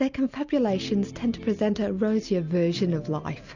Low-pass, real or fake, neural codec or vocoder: 7.2 kHz; real; none